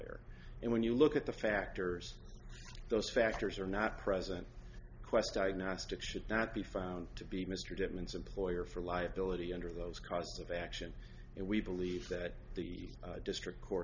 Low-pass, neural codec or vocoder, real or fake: 7.2 kHz; none; real